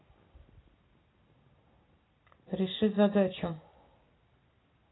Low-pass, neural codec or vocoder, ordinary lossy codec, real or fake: 7.2 kHz; none; AAC, 16 kbps; real